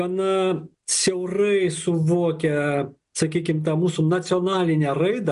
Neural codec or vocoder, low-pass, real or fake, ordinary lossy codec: none; 10.8 kHz; real; MP3, 96 kbps